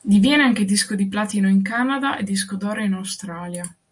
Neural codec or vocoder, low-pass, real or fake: none; 10.8 kHz; real